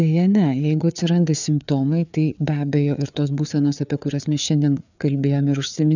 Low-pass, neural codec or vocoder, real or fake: 7.2 kHz; codec, 16 kHz, 4 kbps, FreqCodec, larger model; fake